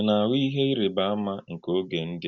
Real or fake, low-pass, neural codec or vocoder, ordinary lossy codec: real; 7.2 kHz; none; none